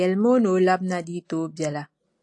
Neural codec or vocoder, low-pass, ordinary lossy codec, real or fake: none; 10.8 kHz; AAC, 64 kbps; real